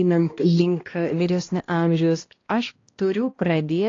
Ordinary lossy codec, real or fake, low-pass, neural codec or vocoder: AAC, 32 kbps; fake; 7.2 kHz; codec, 16 kHz, 1 kbps, X-Codec, HuBERT features, trained on balanced general audio